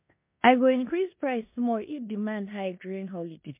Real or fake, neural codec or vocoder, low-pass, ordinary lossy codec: fake; codec, 16 kHz in and 24 kHz out, 0.9 kbps, LongCat-Audio-Codec, four codebook decoder; 3.6 kHz; MP3, 32 kbps